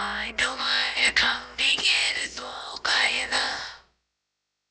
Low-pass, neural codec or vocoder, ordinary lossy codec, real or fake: none; codec, 16 kHz, about 1 kbps, DyCAST, with the encoder's durations; none; fake